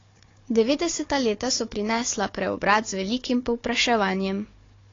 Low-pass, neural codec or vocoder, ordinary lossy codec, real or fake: 7.2 kHz; codec, 16 kHz, 4 kbps, FunCodec, trained on Chinese and English, 50 frames a second; AAC, 32 kbps; fake